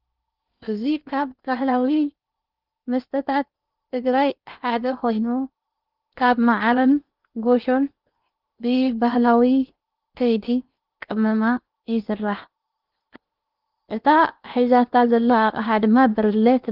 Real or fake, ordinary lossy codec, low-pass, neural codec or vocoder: fake; Opus, 24 kbps; 5.4 kHz; codec, 16 kHz in and 24 kHz out, 0.8 kbps, FocalCodec, streaming, 65536 codes